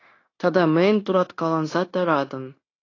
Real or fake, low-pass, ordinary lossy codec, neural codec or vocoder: fake; 7.2 kHz; AAC, 32 kbps; codec, 16 kHz, 0.9 kbps, LongCat-Audio-Codec